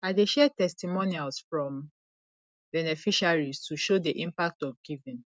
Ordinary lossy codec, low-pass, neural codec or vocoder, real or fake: none; none; none; real